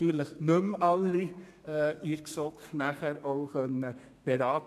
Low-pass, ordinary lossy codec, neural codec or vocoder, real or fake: 14.4 kHz; MP3, 96 kbps; codec, 32 kHz, 1.9 kbps, SNAC; fake